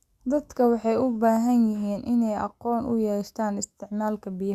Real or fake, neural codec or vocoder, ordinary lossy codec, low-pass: fake; autoencoder, 48 kHz, 128 numbers a frame, DAC-VAE, trained on Japanese speech; Opus, 64 kbps; 14.4 kHz